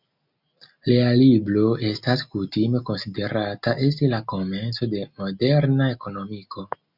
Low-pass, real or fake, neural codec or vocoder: 5.4 kHz; real; none